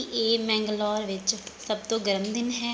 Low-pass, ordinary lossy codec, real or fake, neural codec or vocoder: none; none; real; none